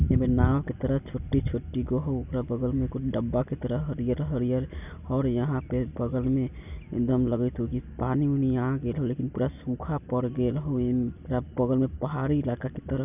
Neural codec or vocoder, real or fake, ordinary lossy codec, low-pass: none; real; none; 3.6 kHz